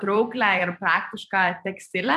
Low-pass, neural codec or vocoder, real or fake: 14.4 kHz; vocoder, 44.1 kHz, 128 mel bands every 256 samples, BigVGAN v2; fake